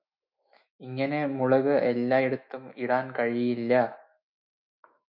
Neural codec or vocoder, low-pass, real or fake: autoencoder, 48 kHz, 128 numbers a frame, DAC-VAE, trained on Japanese speech; 5.4 kHz; fake